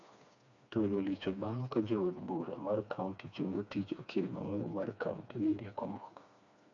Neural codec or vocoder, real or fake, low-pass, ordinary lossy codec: codec, 16 kHz, 2 kbps, FreqCodec, smaller model; fake; 7.2 kHz; none